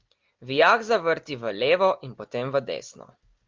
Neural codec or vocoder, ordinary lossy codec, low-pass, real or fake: none; Opus, 32 kbps; 7.2 kHz; real